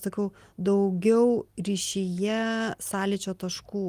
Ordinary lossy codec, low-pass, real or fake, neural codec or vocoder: Opus, 32 kbps; 14.4 kHz; real; none